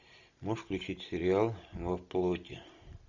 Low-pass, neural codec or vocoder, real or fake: 7.2 kHz; none; real